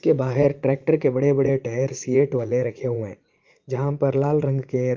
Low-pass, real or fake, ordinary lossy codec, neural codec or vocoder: 7.2 kHz; fake; Opus, 24 kbps; vocoder, 44.1 kHz, 80 mel bands, Vocos